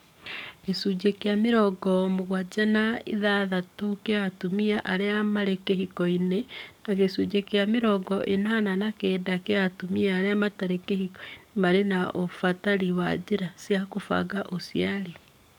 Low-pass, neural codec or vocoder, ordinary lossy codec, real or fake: 19.8 kHz; codec, 44.1 kHz, 7.8 kbps, DAC; none; fake